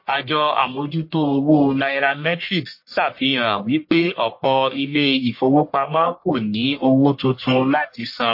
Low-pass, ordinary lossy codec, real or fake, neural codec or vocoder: 5.4 kHz; MP3, 32 kbps; fake; codec, 44.1 kHz, 1.7 kbps, Pupu-Codec